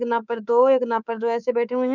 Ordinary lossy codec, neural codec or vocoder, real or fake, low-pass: none; codec, 24 kHz, 3.1 kbps, DualCodec; fake; 7.2 kHz